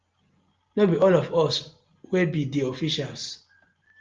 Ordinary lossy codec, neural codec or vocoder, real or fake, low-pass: Opus, 32 kbps; none; real; 7.2 kHz